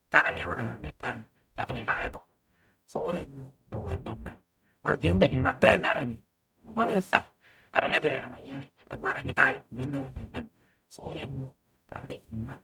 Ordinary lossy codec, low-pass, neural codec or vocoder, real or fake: none; 19.8 kHz; codec, 44.1 kHz, 0.9 kbps, DAC; fake